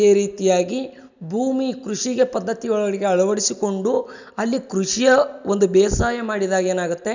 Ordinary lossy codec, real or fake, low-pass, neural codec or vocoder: none; real; 7.2 kHz; none